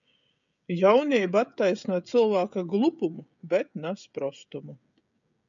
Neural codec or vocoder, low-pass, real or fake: codec, 16 kHz, 16 kbps, FreqCodec, smaller model; 7.2 kHz; fake